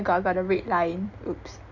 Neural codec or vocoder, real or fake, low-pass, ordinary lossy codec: none; real; 7.2 kHz; none